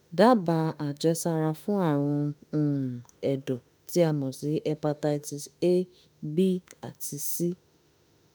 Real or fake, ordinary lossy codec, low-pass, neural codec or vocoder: fake; none; none; autoencoder, 48 kHz, 32 numbers a frame, DAC-VAE, trained on Japanese speech